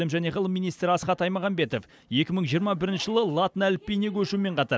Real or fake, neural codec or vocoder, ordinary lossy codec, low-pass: real; none; none; none